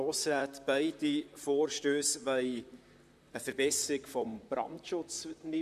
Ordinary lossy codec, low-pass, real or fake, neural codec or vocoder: MP3, 96 kbps; 14.4 kHz; fake; vocoder, 44.1 kHz, 128 mel bands, Pupu-Vocoder